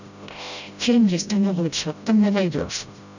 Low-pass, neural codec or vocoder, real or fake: 7.2 kHz; codec, 16 kHz, 0.5 kbps, FreqCodec, smaller model; fake